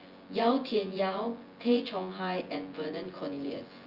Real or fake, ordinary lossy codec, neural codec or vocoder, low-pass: fake; Opus, 32 kbps; vocoder, 24 kHz, 100 mel bands, Vocos; 5.4 kHz